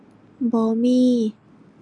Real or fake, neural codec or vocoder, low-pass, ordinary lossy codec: real; none; 10.8 kHz; none